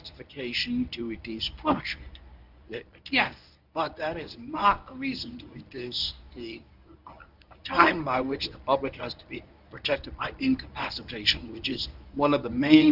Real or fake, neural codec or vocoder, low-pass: fake; codec, 24 kHz, 0.9 kbps, WavTokenizer, medium speech release version 1; 5.4 kHz